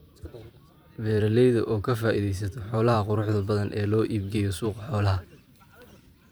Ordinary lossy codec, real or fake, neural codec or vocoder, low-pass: none; fake; vocoder, 44.1 kHz, 128 mel bands every 512 samples, BigVGAN v2; none